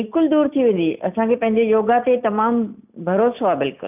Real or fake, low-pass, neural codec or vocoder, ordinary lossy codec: real; 3.6 kHz; none; none